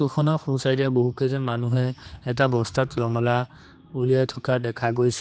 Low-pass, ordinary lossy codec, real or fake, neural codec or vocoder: none; none; fake; codec, 16 kHz, 2 kbps, X-Codec, HuBERT features, trained on general audio